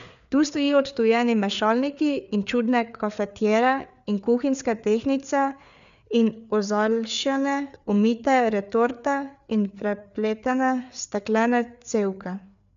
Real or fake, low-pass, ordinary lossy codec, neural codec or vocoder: fake; 7.2 kHz; none; codec, 16 kHz, 4 kbps, FreqCodec, larger model